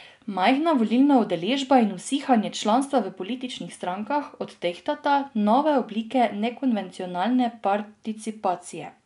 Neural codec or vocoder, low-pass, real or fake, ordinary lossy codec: none; 10.8 kHz; real; none